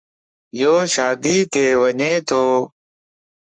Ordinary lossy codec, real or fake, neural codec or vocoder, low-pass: MP3, 64 kbps; fake; codec, 44.1 kHz, 3.4 kbps, Pupu-Codec; 9.9 kHz